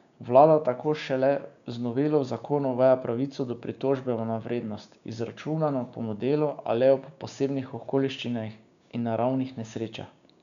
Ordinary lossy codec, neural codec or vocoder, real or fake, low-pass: none; codec, 16 kHz, 6 kbps, DAC; fake; 7.2 kHz